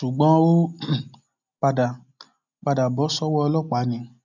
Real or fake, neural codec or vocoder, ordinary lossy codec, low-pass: real; none; none; 7.2 kHz